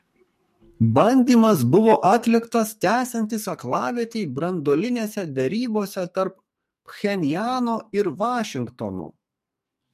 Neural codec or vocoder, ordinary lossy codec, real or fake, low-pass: codec, 44.1 kHz, 2.6 kbps, SNAC; MP3, 64 kbps; fake; 14.4 kHz